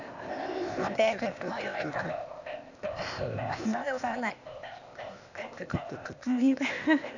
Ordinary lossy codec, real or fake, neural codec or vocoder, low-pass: none; fake; codec, 16 kHz, 0.8 kbps, ZipCodec; 7.2 kHz